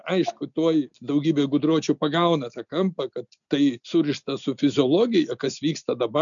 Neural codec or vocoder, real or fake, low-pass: none; real; 7.2 kHz